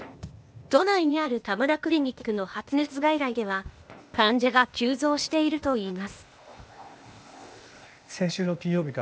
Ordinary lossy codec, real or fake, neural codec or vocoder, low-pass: none; fake; codec, 16 kHz, 0.8 kbps, ZipCodec; none